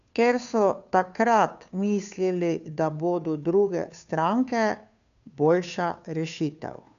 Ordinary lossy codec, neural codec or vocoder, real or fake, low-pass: none; codec, 16 kHz, 2 kbps, FunCodec, trained on Chinese and English, 25 frames a second; fake; 7.2 kHz